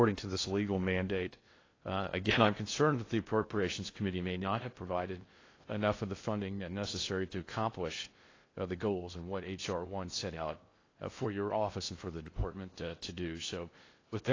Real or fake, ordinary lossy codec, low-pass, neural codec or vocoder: fake; AAC, 32 kbps; 7.2 kHz; codec, 16 kHz in and 24 kHz out, 0.6 kbps, FocalCodec, streaming, 2048 codes